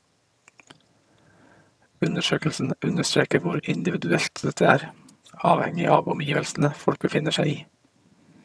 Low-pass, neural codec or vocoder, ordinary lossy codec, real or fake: none; vocoder, 22.05 kHz, 80 mel bands, HiFi-GAN; none; fake